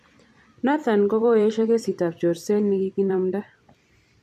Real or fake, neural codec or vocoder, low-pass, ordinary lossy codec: fake; vocoder, 44.1 kHz, 128 mel bands every 256 samples, BigVGAN v2; 14.4 kHz; AAC, 96 kbps